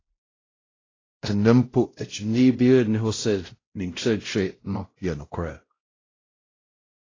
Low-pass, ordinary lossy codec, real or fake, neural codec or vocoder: 7.2 kHz; AAC, 32 kbps; fake; codec, 16 kHz, 0.5 kbps, X-Codec, WavLM features, trained on Multilingual LibriSpeech